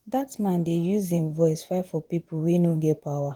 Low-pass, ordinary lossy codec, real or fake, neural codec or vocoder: 19.8 kHz; Opus, 24 kbps; fake; vocoder, 44.1 kHz, 128 mel bands, Pupu-Vocoder